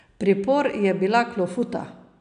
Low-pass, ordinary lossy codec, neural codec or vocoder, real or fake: 9.9 kHz; none; none; real